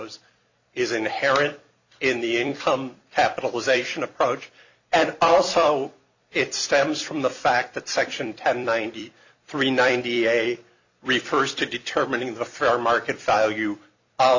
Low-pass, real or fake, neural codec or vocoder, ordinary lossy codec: 7.2 kHz; fake; vocoder, 44.1 kHz, 128 mel bands every 256 samples, BigVGAN v2; Opus, 64 kbps